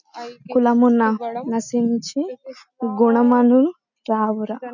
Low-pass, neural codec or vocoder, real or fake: 7.2 kHz; none; real